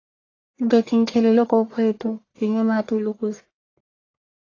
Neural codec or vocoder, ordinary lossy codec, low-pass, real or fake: codec, 44.1 kHz, 1.7 kbps, Pupu-Codec; AAC, 32 kbps; 7.2 kHz; fake